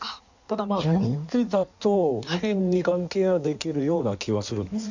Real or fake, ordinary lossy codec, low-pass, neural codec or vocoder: fake; none; 7.2 kHz; codec, 16 kHz in and 24 kHz out, 1.1 kbps, FireRedTTS-2 codec